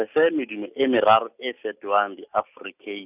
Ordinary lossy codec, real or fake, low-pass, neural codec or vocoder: none; real; 3.6 kHz; none